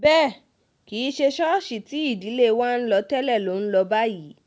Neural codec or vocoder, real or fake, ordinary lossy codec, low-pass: none; real; none; none